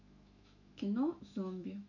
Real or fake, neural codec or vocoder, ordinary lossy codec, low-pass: fake; autoencoder, 48 kHz, 128 numbers a frame, DAC-VAE, trained on Japanese speech; none; 7.2 kHz